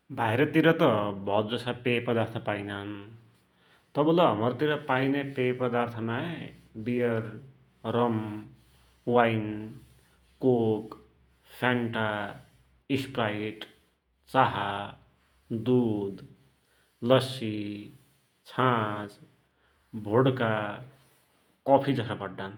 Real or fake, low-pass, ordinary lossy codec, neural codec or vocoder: fake; 19.8 kHz; none; vocoder, 48 kHz, 128 mel bands, Vocos